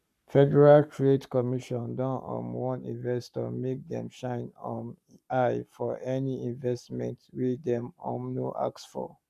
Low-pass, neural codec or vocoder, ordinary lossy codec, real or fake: 14.4 kHz; codec, 44.1 kHz, 7.8 kbps, Pupu-Codec; none; fake